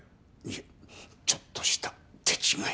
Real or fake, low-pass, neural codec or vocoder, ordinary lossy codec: real; none; none; none